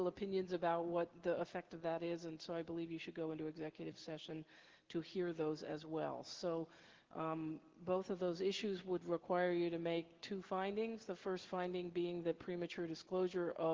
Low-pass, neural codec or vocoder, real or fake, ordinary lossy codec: 7.2 kHz; none; real; Opus, 16 kbps